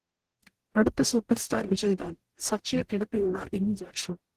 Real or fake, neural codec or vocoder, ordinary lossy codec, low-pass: fake; codec, 44.1 kHz, 0.9 kbps, DAC; Opus, 16 kbps; 14.4 kHz